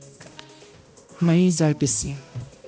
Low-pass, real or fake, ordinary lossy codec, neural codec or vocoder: none; fake; none; codec, 16 kHz, 1 kbps, X-Codec, HuBERT features, trained on balanced general audio